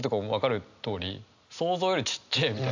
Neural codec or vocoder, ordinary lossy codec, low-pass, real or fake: none; AAC, 48 kbps; 7.2 kHz; real